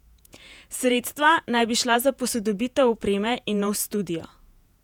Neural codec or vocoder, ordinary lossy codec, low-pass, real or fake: vocoder, 48 kHz, 128 mel bands, Vocos; none; 19.8 kHz; fake